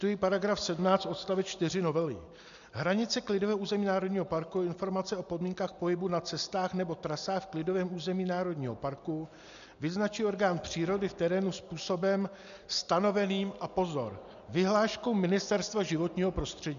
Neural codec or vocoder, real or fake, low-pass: none; real; 7.2 kHz